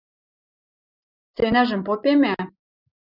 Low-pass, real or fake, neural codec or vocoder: 5.4 kHz; real; none